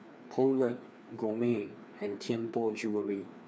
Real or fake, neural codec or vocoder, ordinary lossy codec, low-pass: fake; codec, 16 kHz, 2 kbps, FreqCodec, larger model; none; none